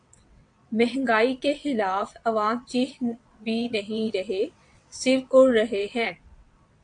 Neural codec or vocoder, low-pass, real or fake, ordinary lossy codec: vocoder, 22.05 kHz, 80 mel bands, WaveNeXt; 9.9 kHz; fake; AAC, 64 kbps